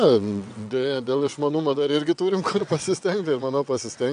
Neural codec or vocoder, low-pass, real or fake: vocoder, 22.05 kHz, 80 mel bands, WaveNeXt; 9.9 kHz; fake